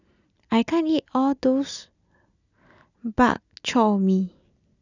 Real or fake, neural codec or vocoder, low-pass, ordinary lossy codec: real; none; 7.2 kHz; none